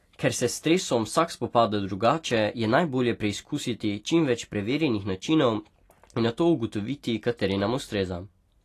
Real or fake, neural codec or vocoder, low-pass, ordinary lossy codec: real; none; 14.4 kHz; AAC, 48 kbps